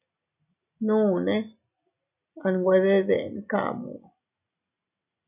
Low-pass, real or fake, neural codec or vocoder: 3.6 kHz; real; none